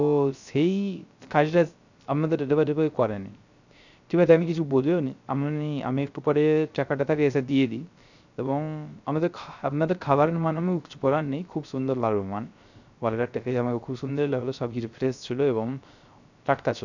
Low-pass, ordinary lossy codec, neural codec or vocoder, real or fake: 7.2 kHz; none; codec, 16 kHz, 0.3 kbps, FocalCodec; fake